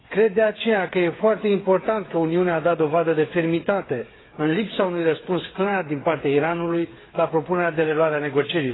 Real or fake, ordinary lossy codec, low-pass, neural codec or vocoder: fake; AAC, 16 kbps; 7.2 kHz; codec, 16 kHz, 8 kbps, FreqCodec, smaller model